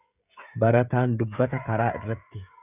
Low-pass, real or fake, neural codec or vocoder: 3.6 kHz; fake; codec, 16 kHz, 16 kbps, FreqCodec, smaller model